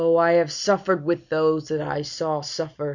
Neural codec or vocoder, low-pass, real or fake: none; 7.2 kHz; real